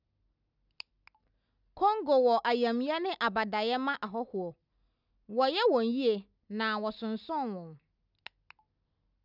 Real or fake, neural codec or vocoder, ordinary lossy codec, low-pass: real; none; none; 5.4 kHz